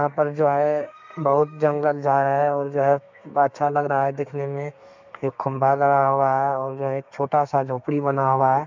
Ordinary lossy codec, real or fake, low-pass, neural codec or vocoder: MP3, 64 kbps; fake; 7.2 kHz; codec, 32 kHz, 1.9 kbps, SNAC